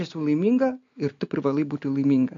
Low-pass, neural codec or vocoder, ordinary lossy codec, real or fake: 7.2 kHz; none; AAC, 64 kbps; real